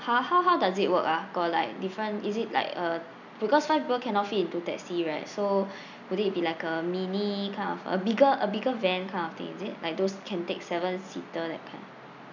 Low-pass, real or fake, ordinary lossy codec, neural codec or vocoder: 7.2 kHz; real; none; none